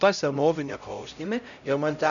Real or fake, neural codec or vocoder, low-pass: fake; codec, 16 kHz, 0.5 kbps, X-Codec, HuBERT features, trained on LibriSpeech; 7.2 kHz